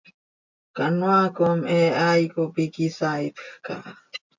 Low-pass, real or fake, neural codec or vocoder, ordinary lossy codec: 7.2 kHz; real; none; MP3, 64 kbps